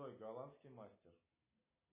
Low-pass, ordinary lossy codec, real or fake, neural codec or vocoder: 3.6 kHz; MP3, 16 kbps; real; none